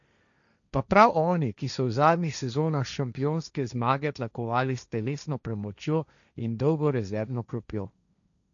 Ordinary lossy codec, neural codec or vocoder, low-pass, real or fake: none; codec, 16 kHz, 1.1 kbps, Voila-Tokenizer; 7.2 kHz; fake